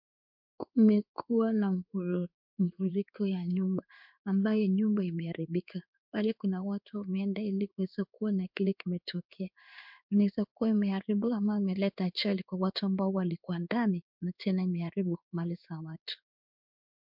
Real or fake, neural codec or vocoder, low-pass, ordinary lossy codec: fake; codec, 16 kHz in and 24 kHz out, 1 kbps, XY-Tokenizer; 5.4 kHz; MP3, 48 kbps